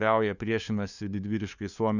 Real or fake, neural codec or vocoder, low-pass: fake; codec, 16 kHz, 2 kbps, FunCodec, trained on LibriTTS, 25 frames a second; 7.2 kHz